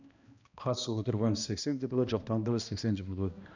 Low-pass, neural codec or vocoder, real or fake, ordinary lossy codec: 7.2 kHz; codec, 16 kHz, 1 kbps, X-Codec, HuBERT features, trained on balanced general audio; fake; none